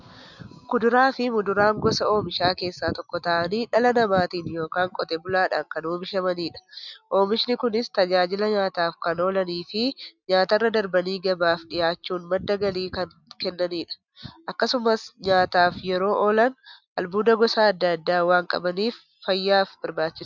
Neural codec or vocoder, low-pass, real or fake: none; 7.2 kHz; real